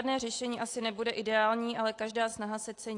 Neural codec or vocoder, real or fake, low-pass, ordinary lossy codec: none; real; 10.8 kHz; AAC, 48 kbps